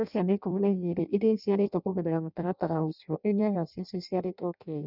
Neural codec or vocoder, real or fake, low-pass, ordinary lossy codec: codec, 16 kHz in and 24 kHz out, 0.6 kbps, FireRedTTS-2 codec; fake; 5.4 kHz; none